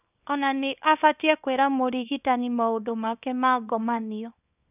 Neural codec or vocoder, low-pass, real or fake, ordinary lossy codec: codec, 24 kHz, 0.9 kbps, WavTokenizer, small release; 3.6 kHz; fake; none